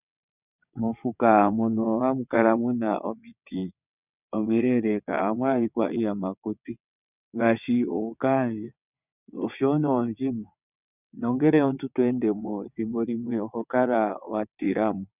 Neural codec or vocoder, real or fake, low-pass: vocoder, 22.05 kHz, 80 mel bands, WaveNeXt; fake; 3.6 kHz